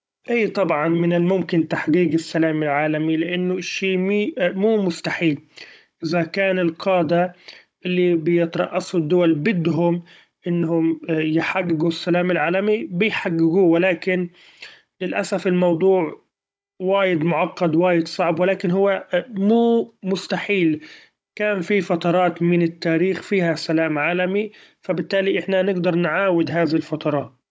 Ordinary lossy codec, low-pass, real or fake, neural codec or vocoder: none; none; fake; codec, 16 kHz, 16 kbps, FunCodec, trained on Chinese and English, 50 frames a second